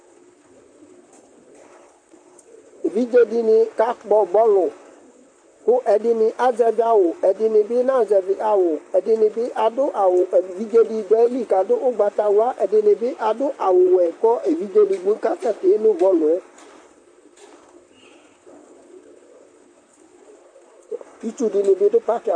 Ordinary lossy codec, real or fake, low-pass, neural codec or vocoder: MP3, 48 kbps; fake; 9.9 kHz; vocoder, 44.1 kHz, 128 mel bands, Pupu-Vocoder